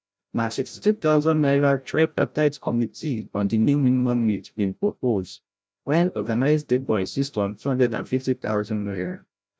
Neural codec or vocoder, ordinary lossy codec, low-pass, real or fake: codec, 16 kHz, 0.5 kbps, FreqCodec, larger model; none; none; fake